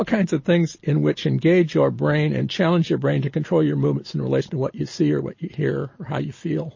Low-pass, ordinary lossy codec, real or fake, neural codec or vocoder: 7.2 kHz; MP3, 32 kbps; real; none